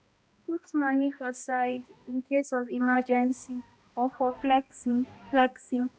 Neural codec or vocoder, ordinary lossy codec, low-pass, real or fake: codec, 16 kHz, 1 kbps, X-Codec, HuBERT features, trained on balanced general audio; none; none; fake